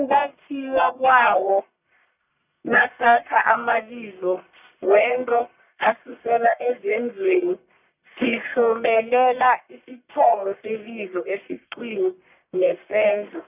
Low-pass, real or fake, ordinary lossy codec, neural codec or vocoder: 3.6 kHz; fake; none; codec, 44.1 kHz, 1.7 kbps, Pupu-Codec